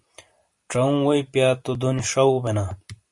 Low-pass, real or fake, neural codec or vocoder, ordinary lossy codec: 10.8 kHz; fake; vocoder, 44.1 kHz, 128 mel bands every 512 samples, BigVGAN v2; MP3, 48 kbps